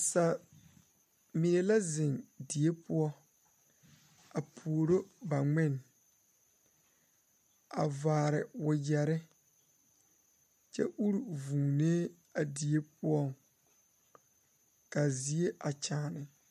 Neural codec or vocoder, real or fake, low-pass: none; real; 14.4 kHz